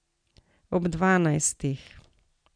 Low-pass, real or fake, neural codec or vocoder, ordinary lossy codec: 9.9 kHz; real; none; none